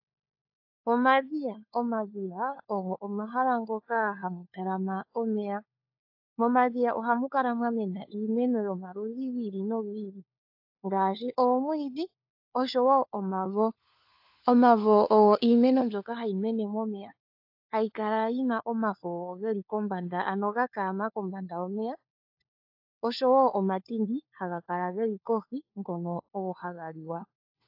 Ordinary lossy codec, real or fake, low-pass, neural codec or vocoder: AAC, 48 kbps; fake; 5.4 kHz; codec, 16 kHz, 4 kbps, FunCodec, trained on LibriTTS, 50 frames a second